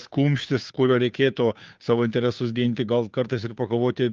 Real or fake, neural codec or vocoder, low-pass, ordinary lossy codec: fake; codec, 16 kHz, 2 kbps, FunCodec, trained on Chinese and English, 25 frames a second; 7.2 kHz; Opus, 24 kbps